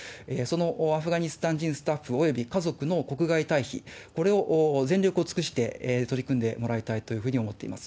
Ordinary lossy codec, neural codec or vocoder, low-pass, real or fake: none; none; none; real